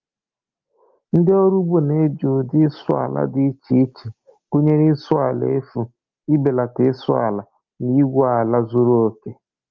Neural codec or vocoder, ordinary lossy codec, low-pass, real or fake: none; Opus, 32 kbps; 7.2 kHz; real